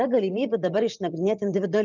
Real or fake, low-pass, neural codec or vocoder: fake; 7.2 kHz; vocoder, 24 kHz, 100 mel bands, Vocos